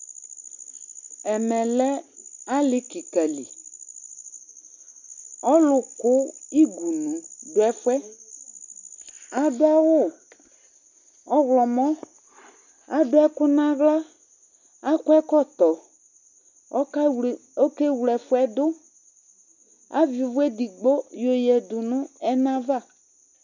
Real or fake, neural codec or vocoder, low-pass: real; none; 7.2 kHz